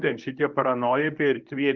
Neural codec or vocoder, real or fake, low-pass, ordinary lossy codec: codec, 24 kHz, 0.9 kbps, WavTokenizer, medium speech release version 1; fake; 7.2 kHz; Opus, 24 kbps